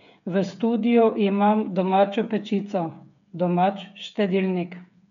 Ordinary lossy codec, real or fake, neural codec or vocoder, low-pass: none; fake; codec, 16 kHz, 8 kbps, FreqCodec, smaller model; 7.2 kHz